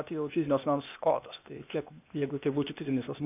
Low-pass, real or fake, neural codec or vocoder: 3.6 kHz; fake; codec, 16 kHz, 0.8 kbps, ZipCodec